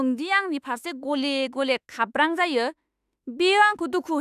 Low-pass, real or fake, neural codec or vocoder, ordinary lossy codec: 14.4 kHz; fake; autoencoder, 48 kHz, 32 numbers a frame, DAC-VAE, trained on Japanese speech; none